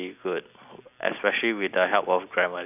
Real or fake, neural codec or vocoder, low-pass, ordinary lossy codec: real; none; 3.6 kHz; none